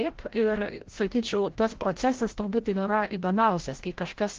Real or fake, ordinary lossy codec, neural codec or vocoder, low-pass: fake; Opus, 16 kbps; codec, 16 kHz, 0.5 kbps, FreqCodec, larger model; 7.2 kHz